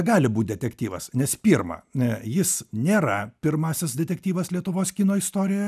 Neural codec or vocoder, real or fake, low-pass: none; real; 14.4 kHz